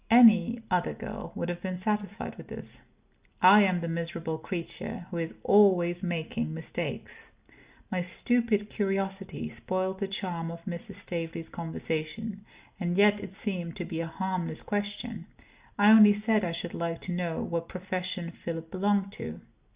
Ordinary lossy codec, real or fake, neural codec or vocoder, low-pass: Opus, 64 kbps; real; none; 3.6 kHz